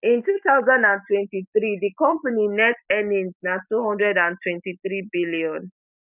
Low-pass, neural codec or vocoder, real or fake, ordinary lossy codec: 3.6 kHz; none; real; none